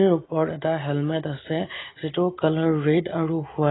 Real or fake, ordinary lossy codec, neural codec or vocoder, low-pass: real; AAC, 16 kbps; none; 7.2 kHz